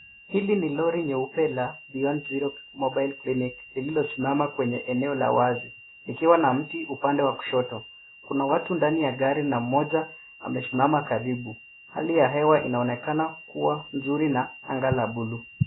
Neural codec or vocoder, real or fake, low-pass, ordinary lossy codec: none; real; 7.2 kHz; AAC, 16 kbps